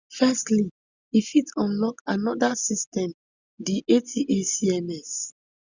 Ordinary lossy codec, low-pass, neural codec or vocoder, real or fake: Opus, 64 kbps; 7.2 kHz; none; real